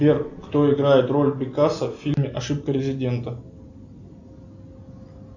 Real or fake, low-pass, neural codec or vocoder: real; 7.2 kHz; none